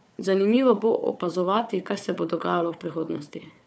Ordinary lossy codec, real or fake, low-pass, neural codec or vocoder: none; fake; none; codec, 16 kHz, 4 kbps, FunCodec, trained on Chinese and English, 50 frames a second